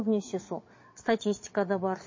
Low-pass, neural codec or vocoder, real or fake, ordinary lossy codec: 7.2 kHz; vocoder, 22.05 kHz, 80 mel bands, Vocos; fake; MP3, 32 kbps